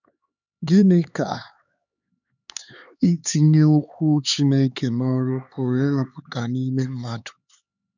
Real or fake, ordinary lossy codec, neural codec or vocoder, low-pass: fake; none; codec, 16 kHz, 4 kbps, X-Codec, HuBERT features, trained on LibriSpeech; 7.2 kHz